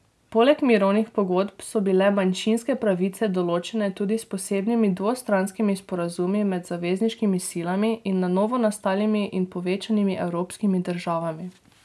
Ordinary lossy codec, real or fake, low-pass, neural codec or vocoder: none; real; none; none